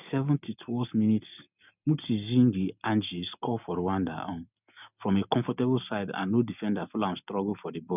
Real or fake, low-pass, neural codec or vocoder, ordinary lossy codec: real; 3.6 kHz; none; none